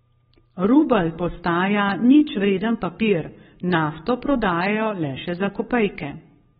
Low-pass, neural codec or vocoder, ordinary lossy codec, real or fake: 19.8 kHz; codec, 44.1 kHz, 7.8 kbps, Pupu-Codec; AAC, 16 kbps; fake